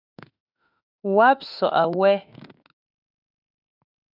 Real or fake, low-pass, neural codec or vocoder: fake; 5.4 kHz; autoencoder, 48 kHz, 32 numbers a frame, DAC-VAE, trained on Japanese speech